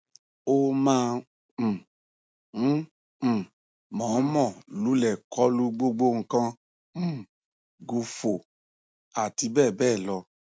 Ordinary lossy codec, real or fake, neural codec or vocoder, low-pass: none; real; none; none